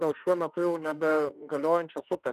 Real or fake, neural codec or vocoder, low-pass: fake; autoencoder, 48 kHz, 32 numbers a frame, DAC-VAE, trained on Japanese speech; 14.4 kHz